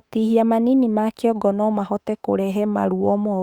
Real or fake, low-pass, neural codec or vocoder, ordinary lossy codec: fake; 19.8 kHz; autoencoder, 48 kHz, 32 numbers a frame, DAC-VAE, trained on Japanese speech; Opus, 64 kbps